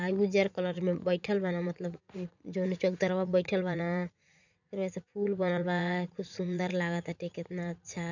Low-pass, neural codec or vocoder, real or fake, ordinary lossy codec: 7.2 kHz; vocoder, 44.1 kHz, 80 mel bands, Vocos; fake; none